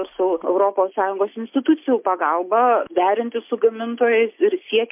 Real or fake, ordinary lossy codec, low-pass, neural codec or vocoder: real; MP3, 32 kbps; 3.6 kHz; none